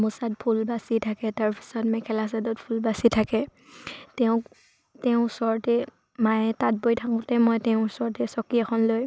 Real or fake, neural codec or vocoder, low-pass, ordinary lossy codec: real; none; none; none